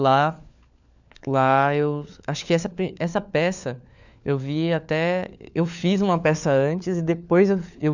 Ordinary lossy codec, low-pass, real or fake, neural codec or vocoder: none; 7.2 kHz; fake; codec, 16 kHz, 4 kbps, FunCodec, trained on LibriTTS, 50 frames a second